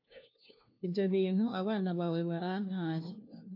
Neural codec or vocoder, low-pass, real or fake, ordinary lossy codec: codec, 16 kHz, 1 kbps, FunCodec, trained on LibriTTS, 50 frames a second; 5.4 kHz; fake; AAC, 32 kbps